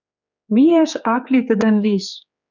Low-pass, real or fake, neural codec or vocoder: 7.2 kHz; fake; codec, 16 kHz, 4 kbps, X-Codec, HuBERT features, trained on general audio